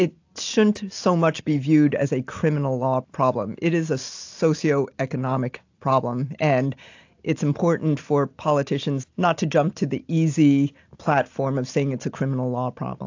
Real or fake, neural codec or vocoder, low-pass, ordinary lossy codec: real; none; 7.2 kHz; AAC, 48 kbps